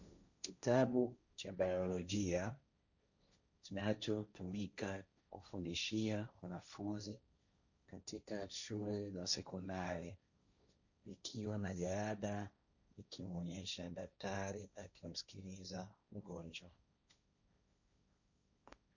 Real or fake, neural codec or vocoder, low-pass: fake; codec, 16 kHz, 1.1 kbps, Voila-Tokenizer; 7.2 kHz